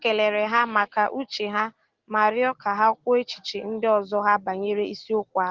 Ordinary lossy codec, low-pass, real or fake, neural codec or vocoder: Opus, 16 kbps; 7.2 kHz; real; none